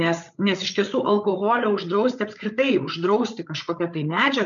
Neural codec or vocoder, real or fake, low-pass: codec, 16 kHz, 8 kbps, FreqCodec, larger model; fake; 7.2 kHz